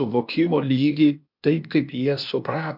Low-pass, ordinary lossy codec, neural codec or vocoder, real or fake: 5.4 kHz; MP3, 48 kbps; codec, 16 kHz, 0.8 kbps, ZipCodec; fake